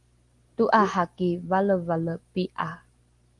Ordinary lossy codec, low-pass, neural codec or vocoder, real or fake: Opus, 24 kbps; 10.8 kHz; none; real